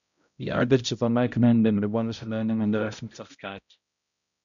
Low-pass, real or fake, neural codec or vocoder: 7.2 kHz; fake; codec, 16 kHz, 0.5 kbps, X-Codec, HuBERT features, trained on balanced general audio